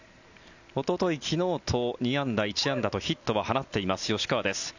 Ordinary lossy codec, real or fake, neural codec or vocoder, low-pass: none; real; none; 7.2 kHz